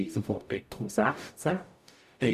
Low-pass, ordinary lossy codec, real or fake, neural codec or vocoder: 14.4 kHz; none; fake; codec, 44.1 kHz, 0.9 kbps, DAC